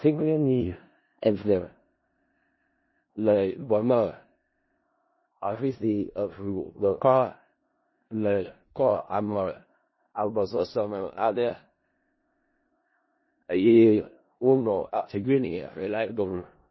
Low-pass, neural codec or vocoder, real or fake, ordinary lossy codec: 7.2 kHz; codec, 16 kHz in and 24 kHz out, 0.4 kbps, LongCat-Audio-Codec, four codebook decoder; fake; MP3, 24 kbps